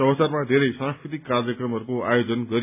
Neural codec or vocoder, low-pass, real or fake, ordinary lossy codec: none; 3.6 kHz; real; none